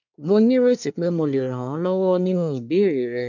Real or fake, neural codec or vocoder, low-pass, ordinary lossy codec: fake; codec, 24 kHz, 1 kbps, SNAC; 7.2 kHz; none